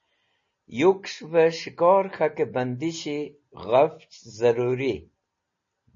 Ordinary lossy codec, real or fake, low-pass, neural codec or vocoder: MP3, 32 kbps; real; 7.2 kHz; none